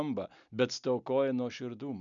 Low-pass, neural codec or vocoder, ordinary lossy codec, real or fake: 7.2 kHz; none; MP3, 96 kbps; real